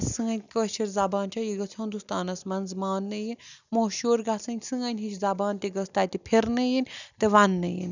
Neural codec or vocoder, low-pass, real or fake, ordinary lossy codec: none; 7.2 kHz; real; none